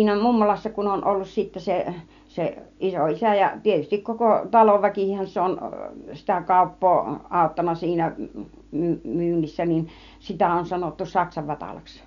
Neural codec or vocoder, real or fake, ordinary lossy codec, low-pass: none; real; none; 7.2 kHz